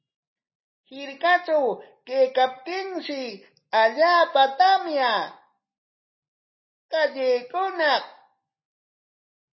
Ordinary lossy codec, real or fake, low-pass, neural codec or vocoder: MP3, 24 kbps; real; 7.2 kHz; none